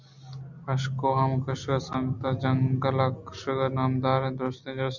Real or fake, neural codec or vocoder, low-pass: real; none; 7.2 kHz